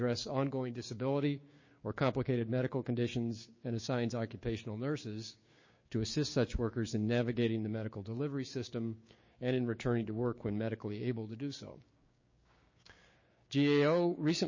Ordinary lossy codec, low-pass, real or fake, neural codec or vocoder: MP3, 32 kbps; 7.2 kHz; fake; codec, 16 kHz, 6 kbps, DAC